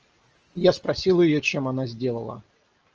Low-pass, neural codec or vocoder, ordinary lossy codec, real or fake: 7.2 kHz; none; Opus, 24 kbps; real